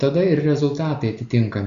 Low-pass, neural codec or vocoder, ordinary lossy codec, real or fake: 7.2 kHz; none; Opus, 64 kbps; real